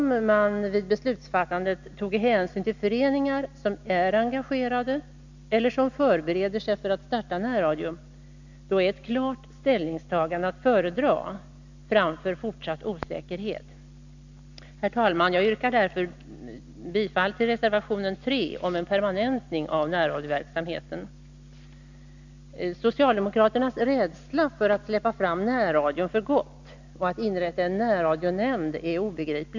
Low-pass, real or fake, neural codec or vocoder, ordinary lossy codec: 7.2 kHz; real; none; none